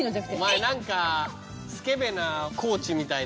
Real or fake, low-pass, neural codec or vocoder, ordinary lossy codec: real; none; none; none